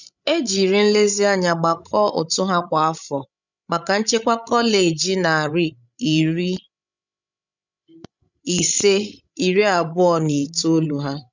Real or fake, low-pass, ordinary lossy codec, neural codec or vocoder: fake; 7.2 kHz; none; codec, 16 kHz, 16 kbps, FreqCodec, larger model